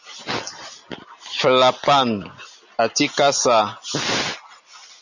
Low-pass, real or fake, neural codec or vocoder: 7.2 kHz; real; none